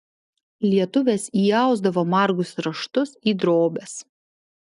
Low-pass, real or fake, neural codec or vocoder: 10.8 kHz; real; none